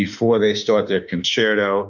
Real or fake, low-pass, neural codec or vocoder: fake; 7.2 kHz; autoencoder, 48 kHz, 32 numbers a frame, DAC-VAE, trained on Japanese speech